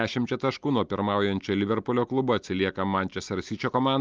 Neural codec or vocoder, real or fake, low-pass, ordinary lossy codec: none; real; 7.2 kHz; Opus, 32 kbps